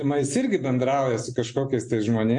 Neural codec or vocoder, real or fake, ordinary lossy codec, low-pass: none; real; MP3, 64 kbps; 10.8 kHz